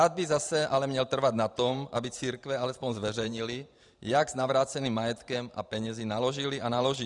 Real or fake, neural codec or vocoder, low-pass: fake; vocoder, 44.1 kHz, 128 mel bands every 512 samples, BigVGAN v2; 10.8 kHz